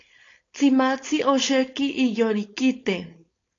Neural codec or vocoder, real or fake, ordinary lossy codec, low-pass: codec, 16 kHz, 4.8 kbps, FACodec; fake; AAC, 32 kbps; 7.2 kHz